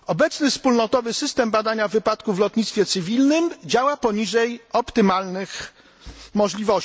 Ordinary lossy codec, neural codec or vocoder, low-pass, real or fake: none; none; none; real